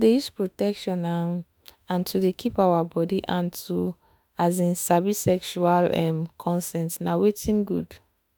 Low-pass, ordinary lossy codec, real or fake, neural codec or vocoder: none; none; fake; autoencoder, 48 kHz, 32 numbers a frame, DAC-VAE, trained on Japanese speech